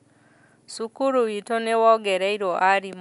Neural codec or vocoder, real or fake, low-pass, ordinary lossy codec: none; real; 10.8 kHz; none